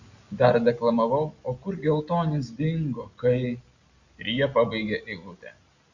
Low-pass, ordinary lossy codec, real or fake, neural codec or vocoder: 7.2 kHz; Opus, 64 kbps; real; none